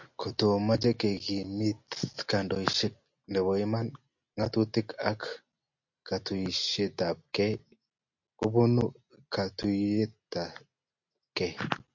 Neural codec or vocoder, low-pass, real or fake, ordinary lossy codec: none; 7.2 kHz; real; MP3, 48 kbps